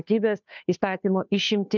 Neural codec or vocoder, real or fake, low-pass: codec, 16 kHz, 2 kbps, FunCodec, trained on Chinese and English, 25 frames a second; fake; 7.2 kHz